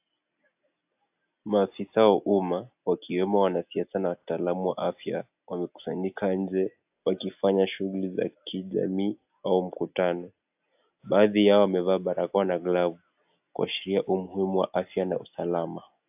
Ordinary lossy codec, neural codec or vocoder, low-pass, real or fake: AAC, 32 kbps; none; 3.6 kHz; real